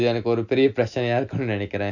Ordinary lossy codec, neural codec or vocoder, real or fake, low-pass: none; none; real; 7.2 kHz